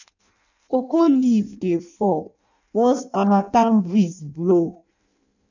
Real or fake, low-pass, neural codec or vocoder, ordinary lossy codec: fake; 7.2 kHz; codec, 16 kHz in and 24 kHz out, 0.6 kbps, FireRedTTS-2 codec; none